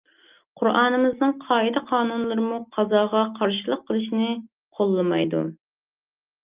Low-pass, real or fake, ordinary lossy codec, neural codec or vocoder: 3.6 kHz; real; Opus, 24 kbps; none